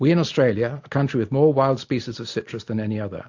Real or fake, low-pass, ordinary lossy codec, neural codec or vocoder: real; 7.2 kHz; AAC, 48 kbps; none